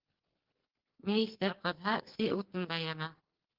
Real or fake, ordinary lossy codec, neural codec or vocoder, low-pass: fake; Opus, 16 kbps; codec, 44.1 kHz, 2.6 kbps, SNAC; 5.4 kHz